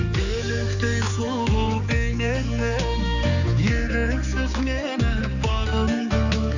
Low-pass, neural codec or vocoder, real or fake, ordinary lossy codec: 7.2 kHz; codec, 16 kHz, 4 kbps, X-Codec, HuBERT features, trained on general audio; fake; none